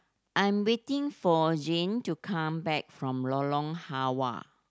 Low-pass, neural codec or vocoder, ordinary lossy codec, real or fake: none; none; none; real